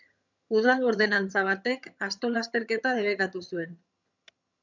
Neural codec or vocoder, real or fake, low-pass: vocoder, 22.05 kHz, 80 mel bands, HiFi-GAN; fake; 7.2 kHz